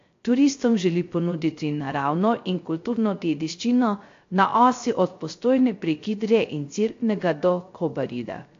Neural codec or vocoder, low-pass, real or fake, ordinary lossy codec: codec, 16 kHz, 0.3 kbps, FocalCodec; 7.2 kHz; fake; AAC, 48 kbps